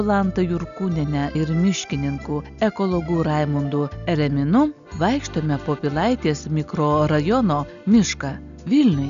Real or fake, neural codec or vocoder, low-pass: real; none; 7.2 kHz